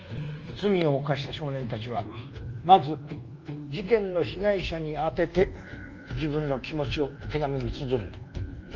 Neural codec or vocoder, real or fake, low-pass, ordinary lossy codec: codec, 24 kHz, 1.2 kbps, DualCodec; fake; 7.2 kHz; Opus, 24 kbps